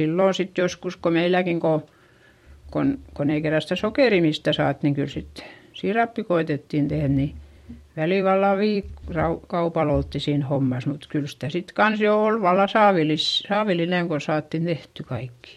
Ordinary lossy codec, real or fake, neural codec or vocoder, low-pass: MP3, 64 kbps; fake; vocoder, 44.1 kHz, 128 mel bands every 256 samples, BigVGAN v2; 19.8 kHz